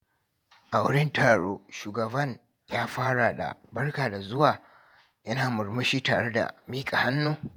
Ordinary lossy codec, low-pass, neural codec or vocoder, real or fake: none; none; vocoder, 48 kHz, 128 mel bands, Vocos; fake